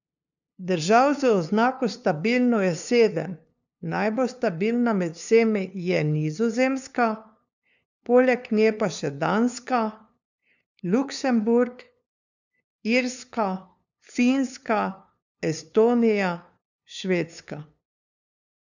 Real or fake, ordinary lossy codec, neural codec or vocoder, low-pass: fake; none; codec, 16 kHz, 2 kbps, FunCodec, trained on LibriTTS, 25 frames a second; 7.2 kHz